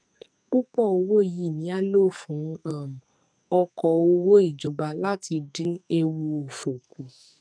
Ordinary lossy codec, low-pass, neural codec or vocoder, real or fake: none; 9.9 kHz; codec, 44.1 kHz, 2.6 kbps, SNAC; fake